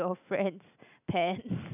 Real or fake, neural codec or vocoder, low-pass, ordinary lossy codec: real; none; 3.6 kHz; none